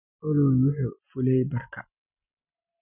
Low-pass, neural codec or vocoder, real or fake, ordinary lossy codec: 3.6 kHz; none; real; none